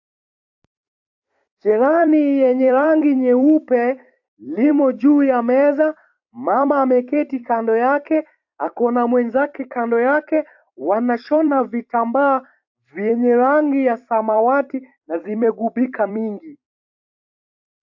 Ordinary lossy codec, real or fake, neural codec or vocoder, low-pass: AAC, 48 kbps; fake; vocoder, 44.1 kHz, 128 mel bands every 256 samples, BigVGAN v2; 7.2 kHz